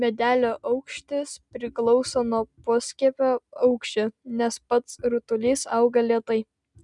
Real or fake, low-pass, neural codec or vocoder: real; 10.8 kHz; none